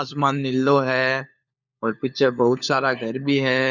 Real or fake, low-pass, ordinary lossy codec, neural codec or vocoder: fake; 7.2 kHz; none; codec, 16 kHz, 4 kbps, FunCodec, trained on LibriTTS, 50 frames a second